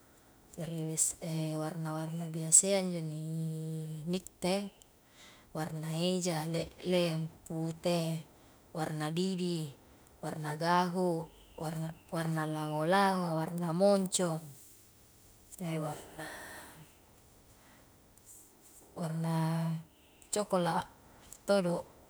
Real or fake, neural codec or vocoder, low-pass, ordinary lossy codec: fake; autoencoder, 48 kHz, 32 numbers a frame, DAC-VAE, trained on Japanese speech; none; none